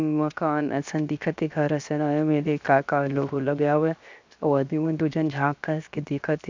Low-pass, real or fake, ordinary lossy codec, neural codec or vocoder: 7.2 kHz; fake; MP3, 64 kbps; codec, 16 kHz, 0.7 kbps, FocalCodec